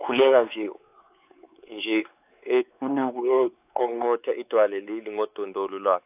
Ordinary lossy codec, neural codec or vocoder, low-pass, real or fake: AAC, 32 kbps; codec, 16 kHz, 4 kbps, X-Codec, WavLM features, trained on Multilingual LibriSpeech; 3.6 kHz; fake